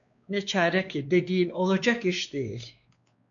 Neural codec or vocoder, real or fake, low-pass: codec, 16 kHz, 2 kbps, X-Codec, WavLM features, trained on Multilingual LibriSpeech; fake; 7.2 kHz